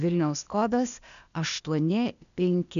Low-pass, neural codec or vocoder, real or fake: 7.2 kHz; codec, 16 kHz, 0.8 kbps, ZipCodec; fake